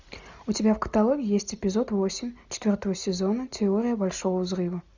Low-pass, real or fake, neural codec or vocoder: 7.2 kHz; real; none